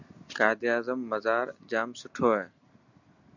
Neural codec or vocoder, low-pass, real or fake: none; 7.2 kHz; real